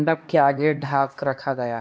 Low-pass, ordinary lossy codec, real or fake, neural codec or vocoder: none; none; fake; codec, 16 kHz, 1 kbps, X-Codec, HuBERT features, trained on LibriSpeech